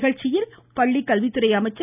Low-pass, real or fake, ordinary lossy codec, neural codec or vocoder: 3.6 kHz; real; none; none